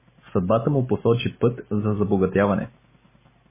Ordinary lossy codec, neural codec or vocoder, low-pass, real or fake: MP3, 16 kbps; none; 3.6 kHz; real